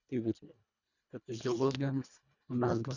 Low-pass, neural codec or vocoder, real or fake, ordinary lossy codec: 7.2 kHz; codec, 24 kHz, 1.5 kbps, HILCodec; fake; none